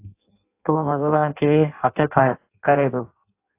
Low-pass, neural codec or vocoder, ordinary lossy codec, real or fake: 3.6 kHz; codec, 16 kHz in and 24 kHz out, 0.6 kbps, FireRedTTS-2 codec; AAC, 24 kbps; fake